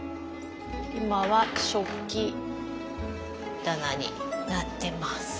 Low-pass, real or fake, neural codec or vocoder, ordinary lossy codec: none; real; none; none